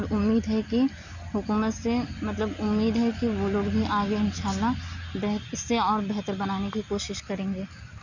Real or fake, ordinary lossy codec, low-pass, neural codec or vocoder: real; none; 7.2 kHz; none